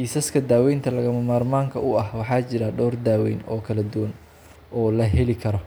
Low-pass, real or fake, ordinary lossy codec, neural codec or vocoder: none; real; none; none